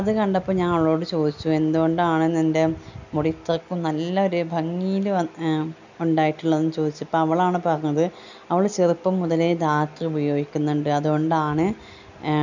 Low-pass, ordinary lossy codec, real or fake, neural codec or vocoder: 7.2 kHz; none; real; none